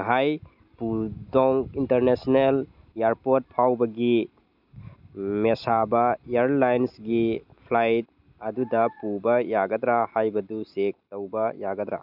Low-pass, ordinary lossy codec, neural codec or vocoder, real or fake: 5.4 kHz; none; none; real